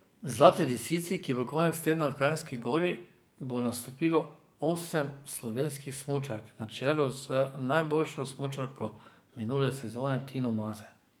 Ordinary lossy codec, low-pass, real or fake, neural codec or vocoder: none; none; fake; codec, 44.1 kHz, 2.6 kbps, SNAC